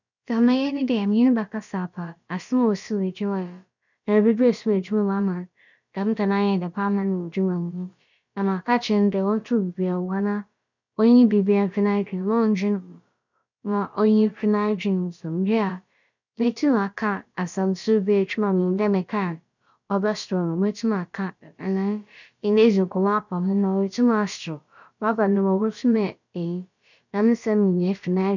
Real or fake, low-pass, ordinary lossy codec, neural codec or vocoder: fake; 7.2 kHz; none; codec, 16 kHz, about 1 kbps, DyCAST, with the encoder's durations